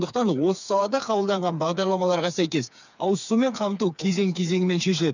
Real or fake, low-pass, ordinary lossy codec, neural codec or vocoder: fake; 7.2 kHz; none; codec, 16 kHz, 4 kbps, FreqCodec, smaller model